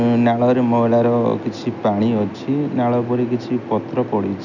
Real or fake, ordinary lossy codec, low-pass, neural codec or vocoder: real; none; 7.2 kHz; none